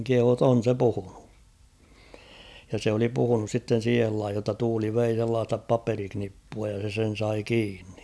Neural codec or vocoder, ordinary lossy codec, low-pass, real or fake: none; none; none; real